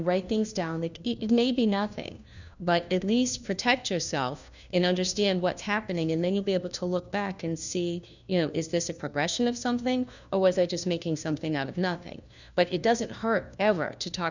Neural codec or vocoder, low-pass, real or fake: codec, 16 kHz, 1 kbps, FunCodec, trained on LibriTTS, 50 frames a second; 7.2 kHz; fake